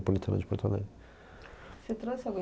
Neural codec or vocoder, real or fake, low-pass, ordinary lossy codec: none; real; none; none